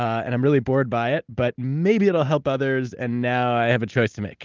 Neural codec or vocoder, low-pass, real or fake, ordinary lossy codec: none; 7.2 kHz; real; Opus, 32 kbps